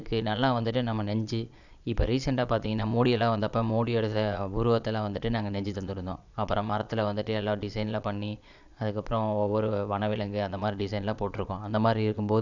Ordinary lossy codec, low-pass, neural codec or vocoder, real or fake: none; 7.2 kHz; vocoder, 22.05 kHz, 80 mel bands, Vocos; fake